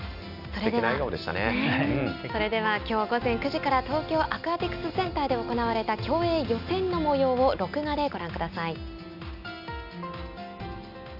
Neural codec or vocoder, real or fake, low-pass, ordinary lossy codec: none; real; 5.4 kHz; none